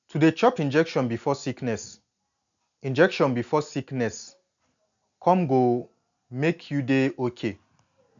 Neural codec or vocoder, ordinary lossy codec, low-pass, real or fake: none; none; 7.2 kHz; real